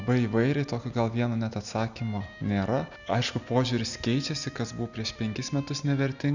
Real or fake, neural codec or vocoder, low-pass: real; none; 7.2 kHz